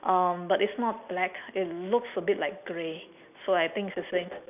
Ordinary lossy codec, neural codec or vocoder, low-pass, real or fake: none; none; 3.6 kHz; real